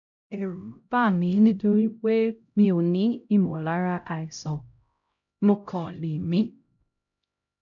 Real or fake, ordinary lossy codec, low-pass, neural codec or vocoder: fake; none; 7.2 kHz; codec, 16 kHz, 0.5 kbps, X-Codec, HuBERT features, trained on LibriSpeech